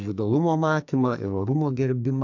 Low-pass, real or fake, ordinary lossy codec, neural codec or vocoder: 7.2 kHz; fake; AAC, 48 kbps; codec, 44.1 kHz, 2.6 kbps, SNAC